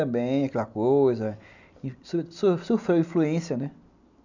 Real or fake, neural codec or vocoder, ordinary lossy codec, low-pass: real; none; none; 7.2 kHz